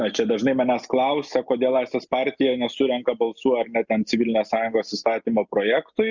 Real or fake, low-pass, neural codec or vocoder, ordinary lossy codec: real; 7.2 kHz; none; Opus, 64 kbps